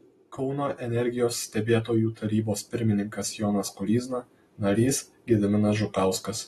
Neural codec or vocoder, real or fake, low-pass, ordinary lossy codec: none; real; 19.8 kHz; AAC, 32 kbps